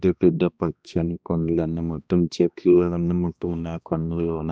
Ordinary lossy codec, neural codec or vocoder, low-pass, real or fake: none; codec, 16 kHz, 1 kbps, X-Codec, HuBERT features, trained on balanced general audio; none; fake